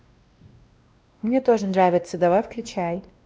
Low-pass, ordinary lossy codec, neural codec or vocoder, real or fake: none; none; codec, 16 kHz, 1 kbps, X-Codec, WavLM features, trained on Multilingual LibriSpeech; fake